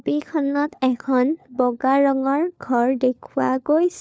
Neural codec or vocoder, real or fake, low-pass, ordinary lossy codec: codec, 16 kHz, 4 kbps, FunCodec, trained on LibriTTS, 50 frames a second; fake; none; none